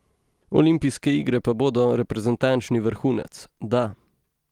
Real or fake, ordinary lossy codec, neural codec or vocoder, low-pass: real; Opus, 24 kbps; none; 19.8 kHz